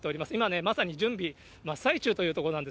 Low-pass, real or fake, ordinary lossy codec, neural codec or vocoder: none; real; none; none